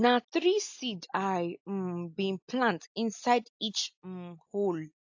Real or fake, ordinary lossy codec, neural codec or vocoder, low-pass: real; AAC, 48 kbps; none; 7.2 kHz